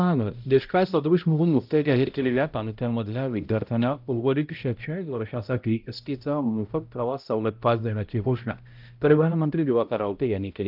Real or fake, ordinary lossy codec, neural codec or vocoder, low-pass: fake; Opus, 24 kbps; codec, 16 kHz, 0.5 kbps, X-Codec, HuBERT features, trained on balanced general audio; 5.4 kHz